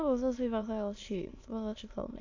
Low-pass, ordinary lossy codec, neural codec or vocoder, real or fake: 7.2 kHz; AAC, 48 kbps; autoencoder, 22.05 kHz, a latent of 192 numbers a frame, VITS, trained on many speakers; fake